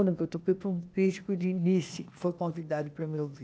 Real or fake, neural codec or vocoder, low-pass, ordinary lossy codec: fake; codec, 16 kHz, 0.8 kbps, ZipCodec; none; none